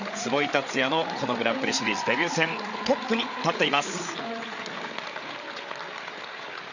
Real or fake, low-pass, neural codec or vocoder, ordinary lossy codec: fake; 7.2 kHz; codec, 16 kHz, 8 kbps, FreqCodec, larger model; none